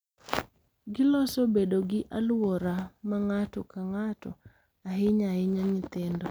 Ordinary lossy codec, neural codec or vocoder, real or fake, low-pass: none; none; real; none